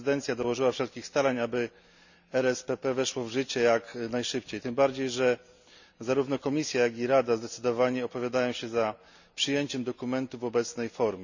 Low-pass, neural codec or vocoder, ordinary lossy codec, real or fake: 7.2 kHz; none; none; real